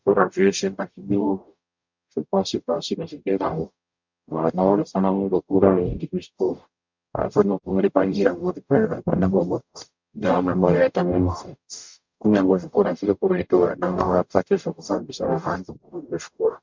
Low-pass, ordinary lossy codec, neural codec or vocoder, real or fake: 7.2 kHz; MP3, 64 kbps; codec, 44.1 kHz, 0.9 kbps, DAC; fake